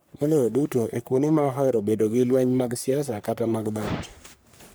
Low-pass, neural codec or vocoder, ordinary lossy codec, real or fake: none; codec, 44.1 kHz, 3.4 kbps, Pupu-Codec; none; fake